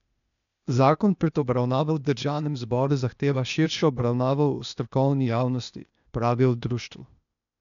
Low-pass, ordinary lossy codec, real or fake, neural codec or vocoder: 7.2 kHz; none; fake; codec, 16 kHz, 0.8 kbps, ZipCodec